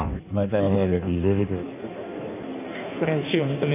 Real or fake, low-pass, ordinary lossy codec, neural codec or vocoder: fake; 3.6 kHz; none; codec, 16 kHz in and 24 kHz out, 1.1 kbps, FireRedTTS-2 codec